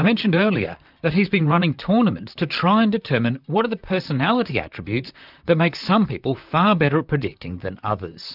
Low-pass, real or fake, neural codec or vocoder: 5.4 kHz; fake; vocoder, 44.1 kHz, 128 mel bands, Pupu-Vocoder